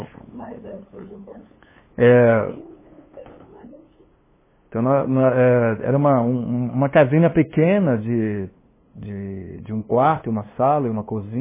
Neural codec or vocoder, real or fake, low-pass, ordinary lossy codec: codec, 16 kHz, 2 kbps, FunCodec, trained on LibriTTS, 25 frames a second; fake; 3.6 kHz; MP3, 16 kbps